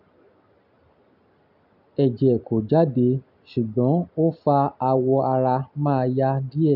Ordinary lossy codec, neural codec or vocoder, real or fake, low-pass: none; none; real; 5.4 kHz